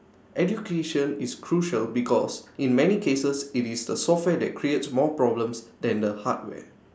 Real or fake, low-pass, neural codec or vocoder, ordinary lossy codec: real; none; none; none